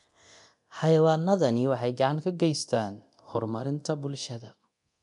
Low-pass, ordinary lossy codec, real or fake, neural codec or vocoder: 10.8 kHz; none; fake; codec, 24 kHz, 0.9 kbps, DualCodec